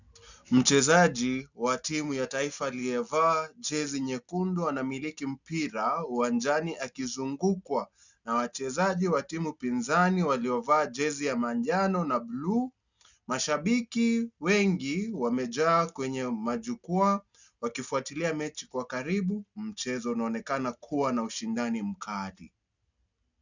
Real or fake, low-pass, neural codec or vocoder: real; 7.2 kHz; none